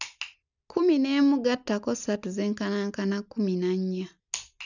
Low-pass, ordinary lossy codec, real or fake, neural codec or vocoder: 7.2 kHz; none; real; none